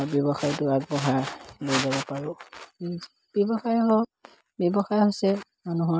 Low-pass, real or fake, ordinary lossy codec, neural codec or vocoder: none; real; none; none